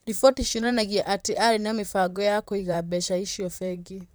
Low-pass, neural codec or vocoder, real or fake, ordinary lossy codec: none; vocoder, 44.1 kHz, 128 mel bands, Pupu-Vocoder; fake; none